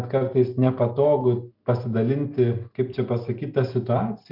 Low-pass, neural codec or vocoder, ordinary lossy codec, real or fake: 5.4 kHz; none; MP3, 48 kbps; real